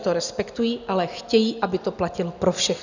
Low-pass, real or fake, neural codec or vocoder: 7.2 kHz; real; none